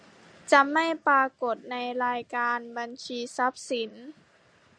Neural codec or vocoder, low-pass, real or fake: none; 9.9 kHz; real